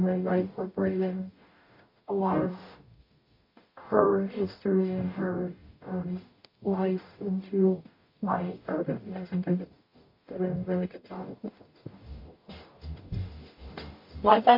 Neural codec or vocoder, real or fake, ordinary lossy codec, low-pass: codec, 44.1 kHz, 0.9 kbps, DAC; fake; MP3, 32 kbps; 5.4 kHz